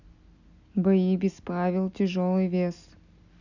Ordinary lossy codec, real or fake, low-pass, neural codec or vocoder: none; real; 7.2 kHz; none